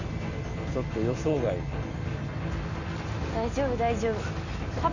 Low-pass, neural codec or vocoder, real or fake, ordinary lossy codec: 7.2 kHz; none; real; none